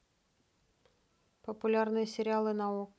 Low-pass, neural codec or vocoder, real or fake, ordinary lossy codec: none; none; real; none